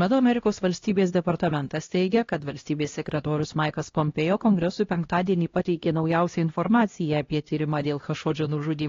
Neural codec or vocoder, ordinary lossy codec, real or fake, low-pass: codec, 16 kHz, 1 kbps, X-Codec, HuBERT features, trained on LibriSpeech; AAC, 32 kbps; fake; 7.2 kHz